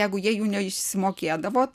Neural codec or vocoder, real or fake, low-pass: none; real; 14.4 kHz